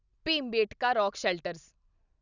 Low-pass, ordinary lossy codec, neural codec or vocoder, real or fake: 7.2 kHz; none; none; real